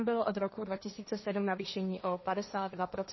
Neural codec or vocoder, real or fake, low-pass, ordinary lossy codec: codec, 16 kHz, 1.1 kbps, Voila-Tokenizer; fake; 7.2 kHz; MP3, 24 kbps